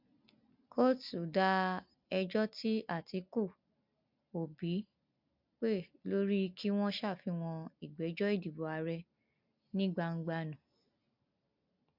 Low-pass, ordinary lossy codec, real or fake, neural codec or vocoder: 5.4 kHz; MP3, 48 kbps; real; none